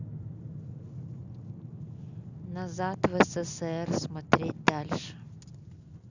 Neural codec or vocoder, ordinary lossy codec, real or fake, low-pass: none; none; real; 7.2 kHz